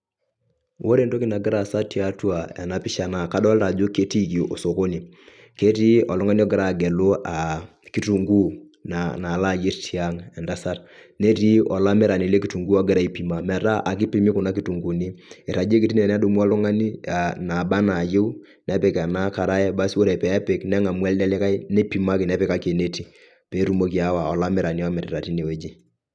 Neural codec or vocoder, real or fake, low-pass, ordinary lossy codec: none; real; none; none